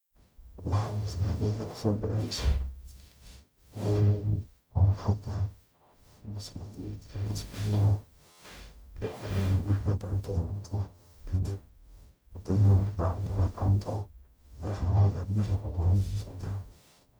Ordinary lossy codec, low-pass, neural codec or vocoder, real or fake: none; none; codec, 44.1 kHz, 0.9 kbps, DAC; fake